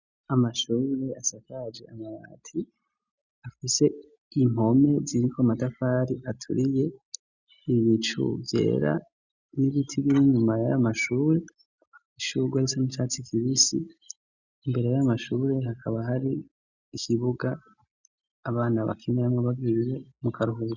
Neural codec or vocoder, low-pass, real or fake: none; 7.2 kHz; real